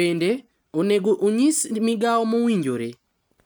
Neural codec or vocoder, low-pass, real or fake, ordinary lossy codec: none; none; real; none